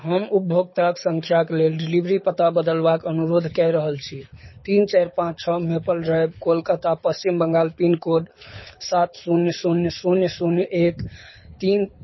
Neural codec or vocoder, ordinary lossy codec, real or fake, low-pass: codec, 24 kHz, 6 kbps, HILCodec; MP3, 24 kbps; fake; 7.2 kHz